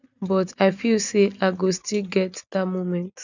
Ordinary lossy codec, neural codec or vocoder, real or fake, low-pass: none; none; real; 7.2 kHz